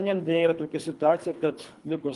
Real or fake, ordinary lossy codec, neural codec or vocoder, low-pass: fake; Opus, 32 kbps; codec, 24 kHz, 3 kbps, HILCodec; 10.8 kHz